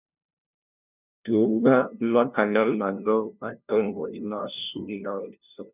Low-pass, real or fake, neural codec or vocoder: 3.6 kHz; fake; codec, 16 kHz, 0.5 kbps, FunCodec, trained on LibriTTS, 25 frames a second